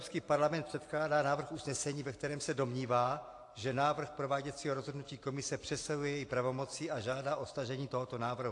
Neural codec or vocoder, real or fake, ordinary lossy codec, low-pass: none; real; AAC, 48 kbps; 10.8 kHz